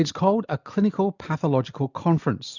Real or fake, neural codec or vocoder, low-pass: real; none; 7.2 kHz